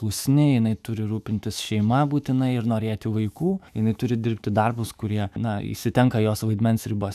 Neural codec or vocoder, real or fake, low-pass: autoencoder, 48 kHz, 128 numbers a frame, DAC-VAE, trained on Japanese speech; fake; 14.4 kHz